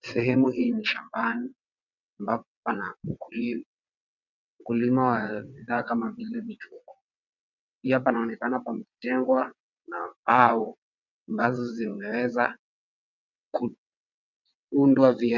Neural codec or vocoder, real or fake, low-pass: vocoder, 22.05 kHz, 80 mel bands, WaveNeXt; fake; 7.2 kHz